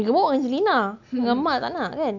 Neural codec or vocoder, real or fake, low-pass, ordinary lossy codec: none; real; 7.2 kHz; none